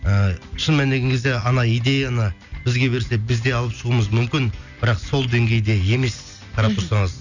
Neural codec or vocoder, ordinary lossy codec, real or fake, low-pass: none; none; real; 7.2 kHz